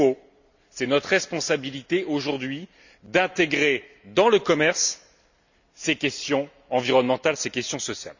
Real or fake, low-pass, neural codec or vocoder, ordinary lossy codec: real; 7.2 kHz; none; none